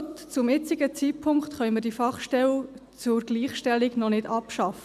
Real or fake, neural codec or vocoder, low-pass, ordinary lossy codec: real; none; 14.4 kHz; none